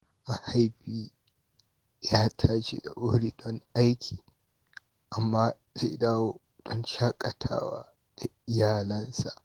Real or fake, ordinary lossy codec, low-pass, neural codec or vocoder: fake; Opus, 16 kbps; 19.8 kHz; autoencoder, 48 kHz, 128 numbers a frame, DAC-VAE, trained on Japanese speech